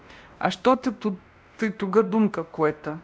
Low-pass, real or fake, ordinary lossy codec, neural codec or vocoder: none; fake; none; codec, 16 kHz, 1 kbps, X-Codec, WavLM features, trained on Multilingual LibriSpeech